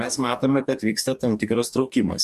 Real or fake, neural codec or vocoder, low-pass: fake; codec, 44.1 kHz, 2.6 kbps, DAC; 14.4 kHz